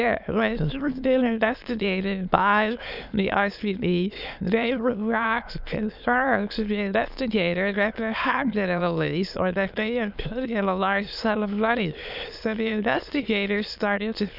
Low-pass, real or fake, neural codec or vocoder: 5.4 kHz; fake; autoencoder, 22.05 kHz, a latent of 192 numbers a frame, VITS, trained on many speakers